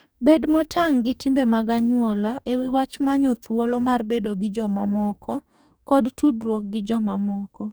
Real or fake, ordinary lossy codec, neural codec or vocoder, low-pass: fake; none; codec, 44.1 kHz, 2.6 kbps, DAC; none